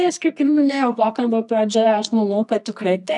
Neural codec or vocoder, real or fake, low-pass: codec, 24 kHz, 0.9 kbps, WavTokenizer, medium music audio release; fake; 10.8 kHz